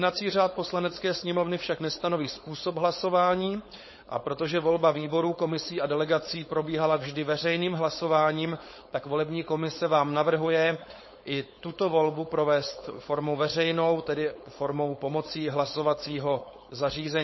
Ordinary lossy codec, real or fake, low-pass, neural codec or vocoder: MP3, 24 kbps; fake; 7.2 kHz; codec, 16 kHz, 4.8 kbps, FACodec